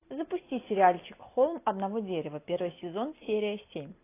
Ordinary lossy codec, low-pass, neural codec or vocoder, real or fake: AAC, 24 kbps; 3.6 kHz; none; real